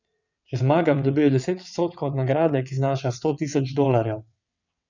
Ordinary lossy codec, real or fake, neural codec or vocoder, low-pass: none; fake; vocoder, 22.05 kHz, 80 mel bands, WaveNeXt; 7.2 kHz